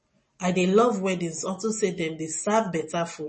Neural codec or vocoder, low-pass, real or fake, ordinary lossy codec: none; 10.8 kHz; real; MP3, 32 kbps